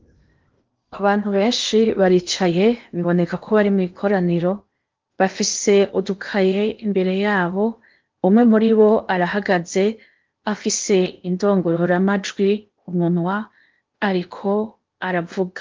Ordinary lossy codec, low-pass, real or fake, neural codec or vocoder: Opus, 32 kbps; 7.2 kHz; fake; codec, 16 kHz in and 24 kHz out, 0.8 kbps, FocalCodec, streaming, 65536 codes